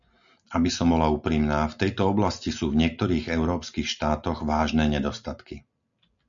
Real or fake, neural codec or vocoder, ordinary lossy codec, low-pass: real; none; MP3, 96 kbps; 7.2 kHz